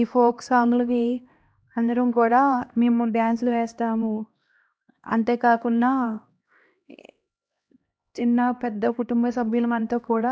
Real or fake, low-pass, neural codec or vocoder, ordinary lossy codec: fake; none; codec, 16 kHz, 1 kbps, X-Codec, HuBERT features, trained on LibriSpeech; none